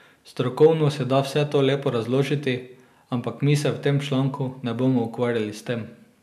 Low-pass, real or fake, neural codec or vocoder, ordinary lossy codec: 14.4 kHz; real; none; none